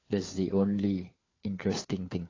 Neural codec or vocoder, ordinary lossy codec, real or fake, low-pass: codec, 16 kHz, 4 kbps, FunCodec, trained on LibriTTS, 50 frames a second; AAC, 32 kbps; fake; 7.2 kHz